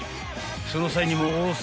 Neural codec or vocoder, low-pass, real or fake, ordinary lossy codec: none; none; real; none